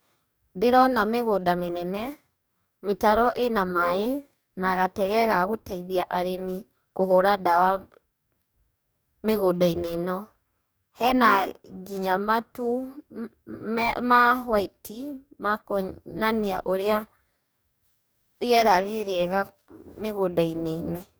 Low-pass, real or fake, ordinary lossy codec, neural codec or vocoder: none; fake; none; codec, 44.1 kHz, 2.6 kbps, DAC